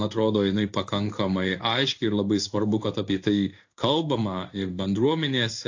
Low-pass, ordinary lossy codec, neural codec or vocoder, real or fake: 7.2 kHz; AAC, 48 kbps; codec, 16 kHz in and 24 kHz out, 1 kbps, XY-Tokenizer; fake